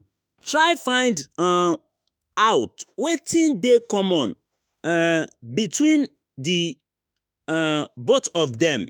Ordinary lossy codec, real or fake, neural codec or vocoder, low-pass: none; fake; autoencoder, 48 kHz, 32 numbers a frame, DAC-VAE, trained on Japanese speech; none